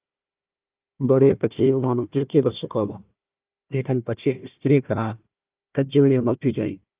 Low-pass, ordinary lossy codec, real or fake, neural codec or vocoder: 3.6 kHz; Opus, 24 kbps; fake; codec, 16 kHz, 1 kbps, FunCodec, trained on Chinese and English, 50 frames a second